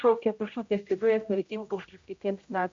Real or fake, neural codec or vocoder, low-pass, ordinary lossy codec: fake; codec, 16 kHz, 0.5 kbps, X-Codec, HuBERT features, trained on general audio; 7.2 kHz; MP3, 48 kbps